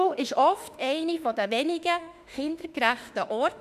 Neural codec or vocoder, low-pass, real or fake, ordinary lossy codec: autoencoder, 48 kHz, 32 numbers a frame, DAC-VAE, trained on Japanese speech; 14.4 kHz; fake; none